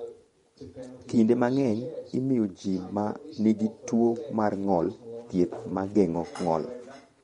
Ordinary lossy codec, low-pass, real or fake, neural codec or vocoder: MP3, 48 kbps; 10.8 kHz; fake; vocoder, 24 kHz, 100 mel bands, Vocos